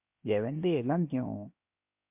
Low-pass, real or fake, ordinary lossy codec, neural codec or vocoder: 3.6 kHz; fake; MP3, 32 kbps; codec, 16 kHz, 0.7 kbps, FocalCodec